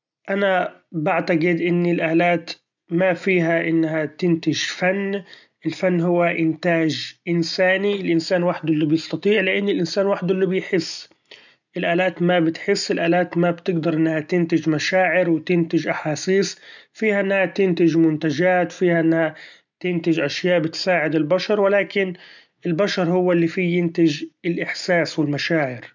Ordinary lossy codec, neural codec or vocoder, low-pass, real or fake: none; none; 7.2 kHz; real